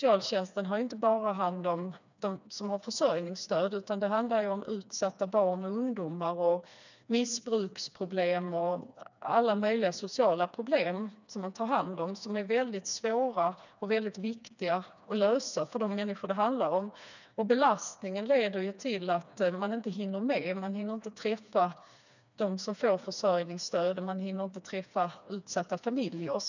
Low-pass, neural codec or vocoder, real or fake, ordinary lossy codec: 7.2 kHz; codec, 16 kHz, 2 kbps, FreqCodec, smaller model; fake; none